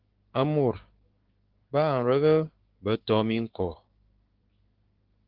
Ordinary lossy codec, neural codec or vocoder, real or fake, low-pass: Opus, 16 kbps; codec, 16 kHz, 6 kbps, DAC; fake; 5.4 kHz